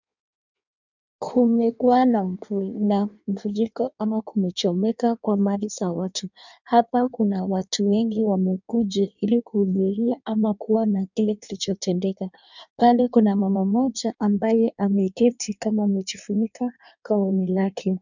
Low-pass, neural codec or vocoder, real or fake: 7.2 kHz; codec, 16 kHz in and 24 kHz out, 1.1 kbps, FireRedTTS-2 codec; fake